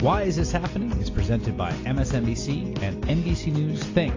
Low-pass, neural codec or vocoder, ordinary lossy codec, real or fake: 7.2 kHz; none; AAC, 32 kbps; real